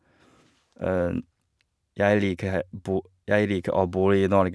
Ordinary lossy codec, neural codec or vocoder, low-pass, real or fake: none; none; none; real